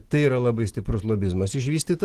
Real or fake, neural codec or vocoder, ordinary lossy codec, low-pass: real; none; Opus, 16 kbps; 14.4 kHz